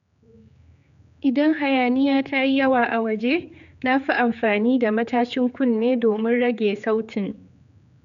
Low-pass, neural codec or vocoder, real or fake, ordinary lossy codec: 7.2 kHz; codec, 16 kHz, 4 kbps, X-Codec, HuBERT features, trained on general audio; fake; none